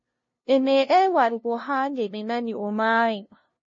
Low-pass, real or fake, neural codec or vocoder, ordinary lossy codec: 7.2 kHz; fake; codec, 16 kHz, 0.5 kbps, FunCodec, trained on LibriTTS, 25 frames a second; MP3, 32 kbps